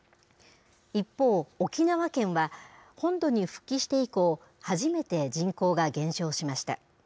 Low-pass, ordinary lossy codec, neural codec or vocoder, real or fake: none; none; none; real